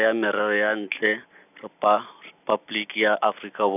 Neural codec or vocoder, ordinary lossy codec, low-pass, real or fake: none; none; 3.6 kHz; real